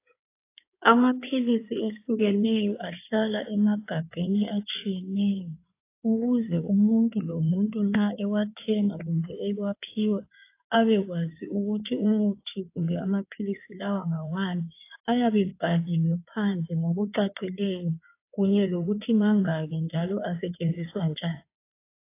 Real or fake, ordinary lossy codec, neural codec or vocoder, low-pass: fake; AAC, 24 kbps; codec, 16 kHz in and 24 kHz out, 2.2 kbps, FireRedTTS-2 codec; 3.6 kHz